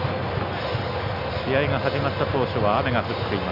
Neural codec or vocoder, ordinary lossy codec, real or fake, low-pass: none; none; real; 5.4 kHz